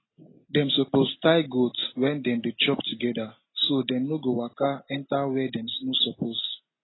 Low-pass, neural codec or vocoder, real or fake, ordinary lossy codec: 7.2 kHz; none; real; AAC, 16 kbps